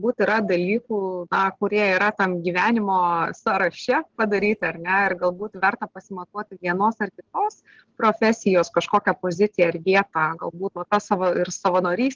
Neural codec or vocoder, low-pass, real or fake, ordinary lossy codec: none; 7.2 kHz; real; Opus, 16 kbps